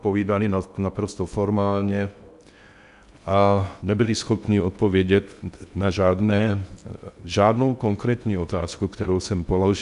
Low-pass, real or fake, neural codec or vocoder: 10.8 kHz; fake; codec, 16 kHz in and 24 kHz out, 0.6 kbps, FocalCodec, streaming, 2048 codes